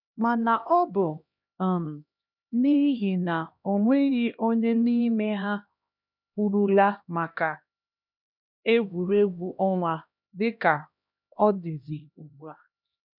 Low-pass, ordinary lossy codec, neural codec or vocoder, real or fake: 5.4 kHz; none; codec, 16 kHz, 1 kbps, X-Codec, HuBERT features, trained on LibriSpeech; fake